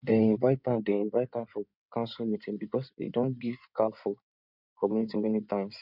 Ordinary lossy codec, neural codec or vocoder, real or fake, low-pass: none; codec, 16 kHz in and 24 kHz out, 2.2 kbps, FireRedTTS-2 codec; fake; 5.4 kHz